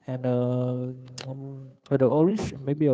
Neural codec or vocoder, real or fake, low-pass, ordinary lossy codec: codec, 16 kHz, 2 kbps, FunCodec, trained on Chinese and English, 25 frames a second; fake; none; none